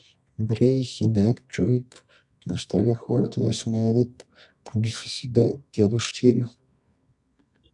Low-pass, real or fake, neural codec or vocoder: 10.8 kHz; fake; codec, 24 kHz, 0.9 kbps, WavTokenizer, medium music audio release